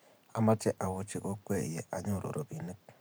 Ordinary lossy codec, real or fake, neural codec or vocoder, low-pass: none; fake; vocoder, 44.1 kHz, 128 mel bands every 512 samples, BigVGAN v2; none